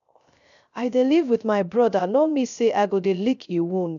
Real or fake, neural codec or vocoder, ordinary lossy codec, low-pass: fake; codec, 16 kHz, 0.3 kbps, FocalCodec; none; 7.2 kHz